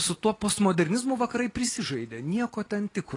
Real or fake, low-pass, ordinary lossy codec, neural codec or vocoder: real; 10.8 kHz; AAC, 32 kbps; none